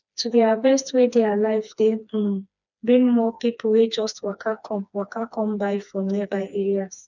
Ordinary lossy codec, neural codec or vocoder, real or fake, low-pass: none; codec, 16 kHz, 2 kbps, FreqCodec, smaller model; fake; 7.2 kHz